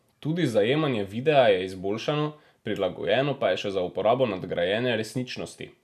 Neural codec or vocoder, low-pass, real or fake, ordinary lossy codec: none; 14.4 kHz; real; none